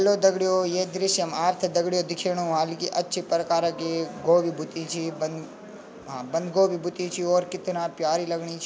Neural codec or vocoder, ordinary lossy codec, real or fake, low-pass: none; none; real; none